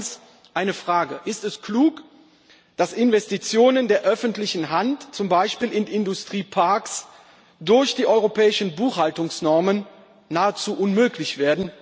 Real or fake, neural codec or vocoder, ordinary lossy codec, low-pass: real; none; none; none